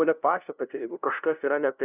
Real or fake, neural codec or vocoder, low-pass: fake; codec, 16 kHz, 0.5 kbps, FunCodec, trained on LibriTTS, 25 frames a second; 3.6 kHz